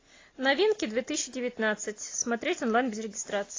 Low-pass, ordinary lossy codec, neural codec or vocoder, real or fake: 7.2 kHz; AAC, 32 kbps; none; real